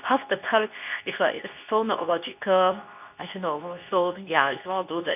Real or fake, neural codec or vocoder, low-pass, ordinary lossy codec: fake; codec, 24 kHz, 0.9 kbps, WavTokenizer, medium speech release version 2; 3.6 kHz; none